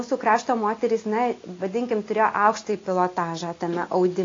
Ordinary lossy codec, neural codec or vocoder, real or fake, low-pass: AAC, 32 kbps; none; real; 7.2 kHz